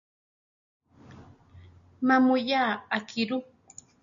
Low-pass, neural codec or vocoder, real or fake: 7.2 kHz; none; real